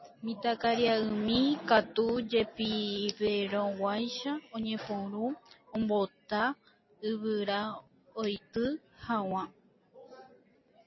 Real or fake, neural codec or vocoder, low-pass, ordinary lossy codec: real; none; 7.2 kHz; MP3, 24 kbps